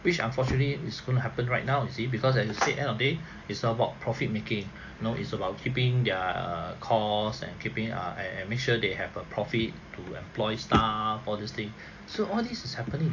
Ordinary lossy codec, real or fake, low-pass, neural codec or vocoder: AAC, 48 kbps; real; 7.2 kHz; none